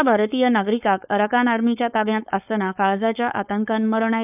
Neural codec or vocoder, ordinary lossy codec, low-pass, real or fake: codec, 16 kHz, 4.8 kbps, FACodec; none; 3.6 kHz; fake